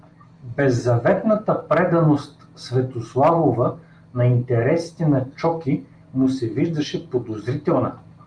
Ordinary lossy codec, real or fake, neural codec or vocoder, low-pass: Opus, 32 kbps; real; none; 9.9 kHz